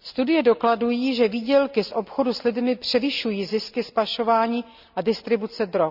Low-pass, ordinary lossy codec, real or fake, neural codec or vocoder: 5.4 kHz; none; real; none